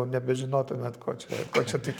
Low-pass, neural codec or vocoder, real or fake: 19.8 kHz; codec, 44.1 kHz, 7.8 kbps, Pupu-Codec; fake